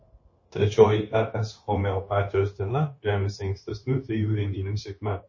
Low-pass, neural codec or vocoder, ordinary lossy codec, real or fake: 7.2 kHz; codec, 16 kHz, 0.9 kbps, LongCat-Audio-Codec; MP3, 32 kbps; fake